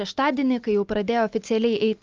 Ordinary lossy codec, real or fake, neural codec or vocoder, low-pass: Opus, 24 kbps; real; none; 7.2 kHz